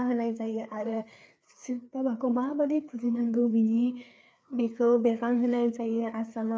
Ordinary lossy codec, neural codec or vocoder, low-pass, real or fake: none; codec, 16 kHz, 4 kbps, FreqCodec, larger model; none; fake